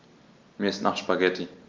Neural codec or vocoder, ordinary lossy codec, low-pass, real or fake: none; Opus, 24 kbps; 7.2 kHz; real